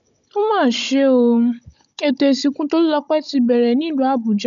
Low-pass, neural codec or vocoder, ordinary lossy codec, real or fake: 7.2 kHz; codec, 16 kHz, 16 kbps, FunCodec, trained on Chinese and English, 50 frames a second; none; fake